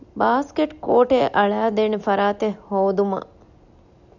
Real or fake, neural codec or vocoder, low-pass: real; none; 7.2 kHz